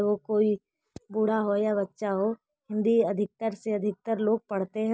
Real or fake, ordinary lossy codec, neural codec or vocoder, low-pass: real; none; none; none